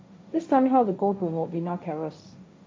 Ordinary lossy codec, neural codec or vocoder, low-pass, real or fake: none; codec, 16 kHz, 1.1 kbps, Voila-Tokenizer; none; fake